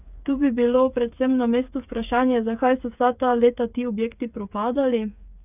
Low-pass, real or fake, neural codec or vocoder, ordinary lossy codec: 3.6 kHz; fake; codec, 16 kHz, 8 kbps, FreqCodec, smaller model; none